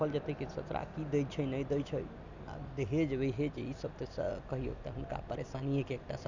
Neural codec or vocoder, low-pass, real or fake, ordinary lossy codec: none; 7.2 kHz; real; none